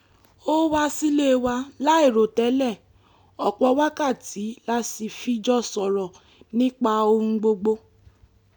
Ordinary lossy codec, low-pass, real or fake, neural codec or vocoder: none; 19.8 kHz; real; none